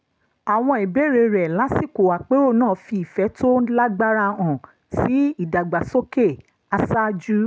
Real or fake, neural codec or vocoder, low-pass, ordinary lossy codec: real; none; none; none